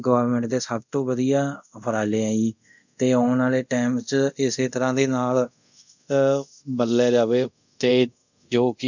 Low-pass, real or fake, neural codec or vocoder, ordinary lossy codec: 7.2 kHz; fake; codec, 24 kHz, 0.5 kbps, DualCodec; none